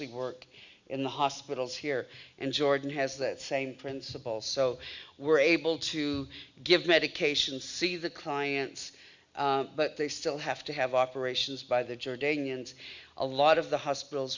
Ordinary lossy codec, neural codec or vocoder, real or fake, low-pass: Opus, 64 kbps; none; real; 7.2 kHz